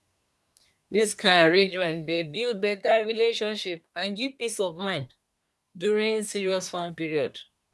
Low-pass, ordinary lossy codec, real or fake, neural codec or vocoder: none; none; fake; codec, 24 kHz, 1 kbps, SNAC